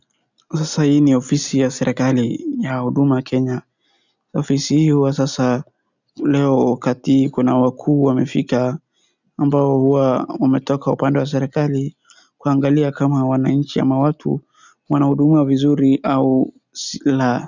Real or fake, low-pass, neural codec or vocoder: real; 7.2 kHz; none